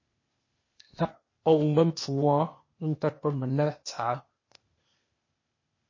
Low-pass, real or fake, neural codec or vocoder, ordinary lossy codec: 7.2 kHz; fake; codec, 16 kHz, 0.8 kbps, ZipCodec; MP3, 32 kbps